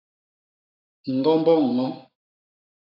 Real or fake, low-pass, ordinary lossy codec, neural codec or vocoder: fake; 5.4 kHz; AAC, 32 kbps; codec, 44.1 kHz, 7.8 kbps, Pupu-Codec